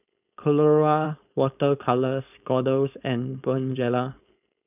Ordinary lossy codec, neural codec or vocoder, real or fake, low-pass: none; codec, 16 kHz, 4.8 kbps, FACodec; fake; 3.6 kHz